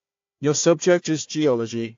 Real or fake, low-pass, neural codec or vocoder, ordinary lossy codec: fake; 7.2 kHz; codec, 16 kHz, 1 kbps, FunCodec, trained on Chinese and English, 50 frames a second; AAC, 48 kbps